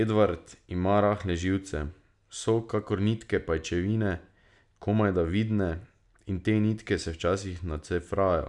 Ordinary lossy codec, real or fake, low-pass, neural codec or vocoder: none; real; 10.8 kHz; none